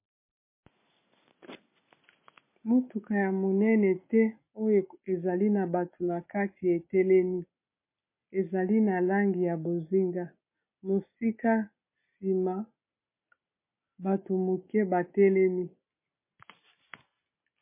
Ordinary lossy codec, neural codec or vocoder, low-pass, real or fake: MP3, 24 kbps; none; 3.6 kHz; real